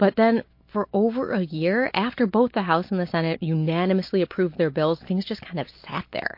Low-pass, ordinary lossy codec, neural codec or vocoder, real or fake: 5.4 kHz; MP3, 32 kbps; none; real